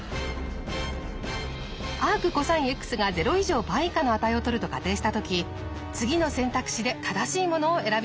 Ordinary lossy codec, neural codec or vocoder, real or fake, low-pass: none; none; real; none